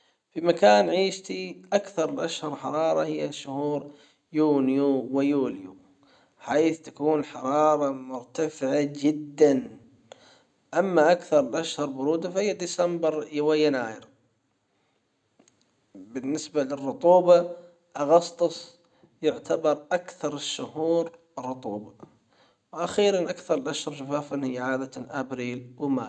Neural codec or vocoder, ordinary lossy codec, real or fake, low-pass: none; none; real; 9.9 kHz